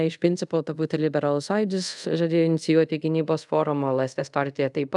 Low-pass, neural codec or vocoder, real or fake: 10.8 kHz; codec, 24 kHz, 0.5 kbps, DualCodec; fake